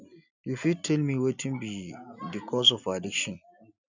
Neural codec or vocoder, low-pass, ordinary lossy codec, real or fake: none; 7.2 kHz; none; real